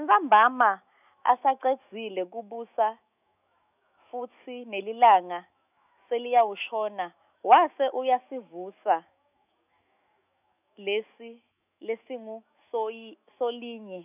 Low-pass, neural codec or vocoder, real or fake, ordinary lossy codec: 3.6 kHz; autoencoder, 48 kHz, 128 numbers a frame, DAC-VAE, trained on Japanese speech; fake; none